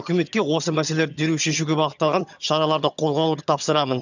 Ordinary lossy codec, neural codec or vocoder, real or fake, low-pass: none; vocoder, 22.05 kHz, 80 mel bands, HiFi-GAN; fake; 7.2 kHz